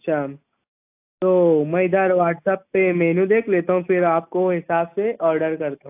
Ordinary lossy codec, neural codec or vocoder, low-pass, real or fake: AAC, 32 kbps; none; 3.6 kHz; real